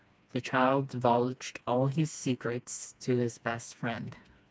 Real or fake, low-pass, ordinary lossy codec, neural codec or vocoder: fake; none; none; codec, 16 kHz, 2 kbps, FreqCodec, smaller model